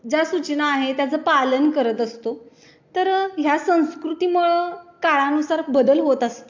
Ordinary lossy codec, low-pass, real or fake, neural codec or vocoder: AAC, 48 kbps; 7.2 kHz; real; none